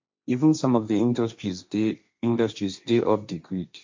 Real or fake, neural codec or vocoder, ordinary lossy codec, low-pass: fake; codec, 16 kHz, 1.1 kbps, Voila-Tokenizer; MP3, 48 kbps; 7.2 kHz